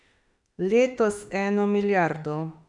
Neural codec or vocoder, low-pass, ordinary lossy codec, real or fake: autoencoder, 48 kHz, 32 numbers a frame, DAC-VAE, trained on Japanese speech; 10.8 kHz; none; fake